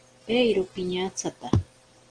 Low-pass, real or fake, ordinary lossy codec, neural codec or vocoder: 9.9 kHz; real; Opus, 16 kbps; none